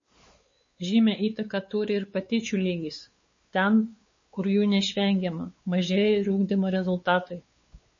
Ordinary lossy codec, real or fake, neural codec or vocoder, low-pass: MP3, 32 kbps; fake; codec, 16 kHz, 4 kbps, X-Codec, WavLM features, trained on Multilingual LibriSpeech; 7.2 kHz